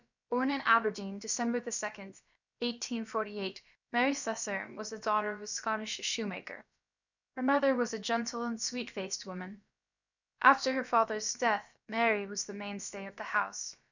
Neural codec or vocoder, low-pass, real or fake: codec, 16 kHz, about 1 kbps, DyCAST, with the encoder's durations; 7.2 kHz; fake